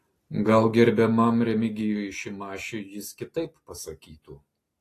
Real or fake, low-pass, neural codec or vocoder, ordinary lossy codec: real; 14.4 kHz; none; AAC, 48 kbps